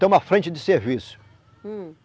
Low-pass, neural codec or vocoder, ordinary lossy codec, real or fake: none; none; none; real